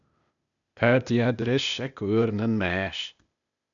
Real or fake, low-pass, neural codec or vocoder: fake; 7.2 kHz; codec, 16 kHz, 0.8 kbps, ZipCodec